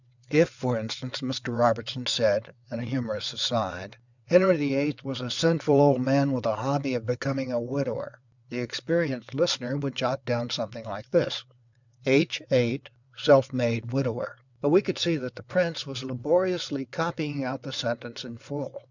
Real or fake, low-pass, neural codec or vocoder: fake; 7.2 kHz; vocoder, 22.05 kHz, 80 mel bands, WaveNeXt